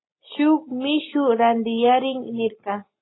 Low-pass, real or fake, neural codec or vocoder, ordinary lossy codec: 7.2 kHz; real; none; AAC, 16 kbps